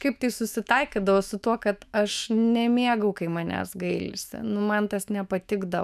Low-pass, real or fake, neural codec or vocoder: 14.4 kHz; fake; autoencoder, 48 kHz, 128 numbers a frame, DAC-VAE, trained on Japanese speech